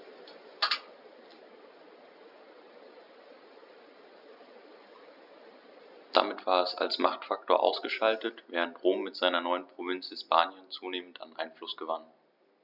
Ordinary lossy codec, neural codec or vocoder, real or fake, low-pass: none; none; real; 5.4 kHz